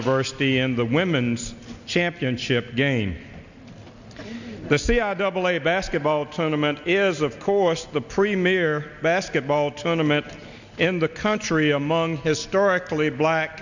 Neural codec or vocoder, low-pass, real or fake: none; 7.2 kHz; real